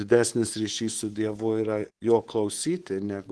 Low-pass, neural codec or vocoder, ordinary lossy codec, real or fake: 10.8 kHz; codec, 24 kHz, 3.1 kbps, DualCodec; Opus, 16 kbps; fake